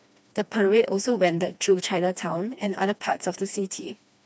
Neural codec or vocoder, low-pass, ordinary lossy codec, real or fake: codec, 16 kHz, 2 kbps, FreqCodec, smaller model; none; none; fake